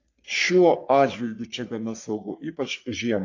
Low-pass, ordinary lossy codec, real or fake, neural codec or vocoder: 7.2 kHz; AAC, 48 kbps; fake; codec, 44.1 kHz, 3.4 kbps, Pupu-Codec